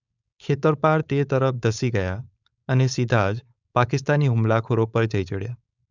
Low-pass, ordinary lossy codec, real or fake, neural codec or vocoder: 7.2 kHz; none; fake; codec, 16 kHz, 4.8 kbps, FACodec